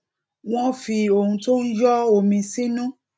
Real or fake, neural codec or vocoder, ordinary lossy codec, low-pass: real; none; none; none